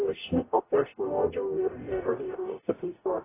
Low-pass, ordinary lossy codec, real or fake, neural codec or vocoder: 3.6 kHz; AAC, 16 kbps; fake; codec, 44.1 kHz, 0.9 kbps, DAC